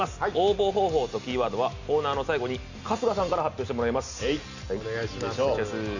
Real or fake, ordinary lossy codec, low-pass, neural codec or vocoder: real; none; 7.2 kHz; none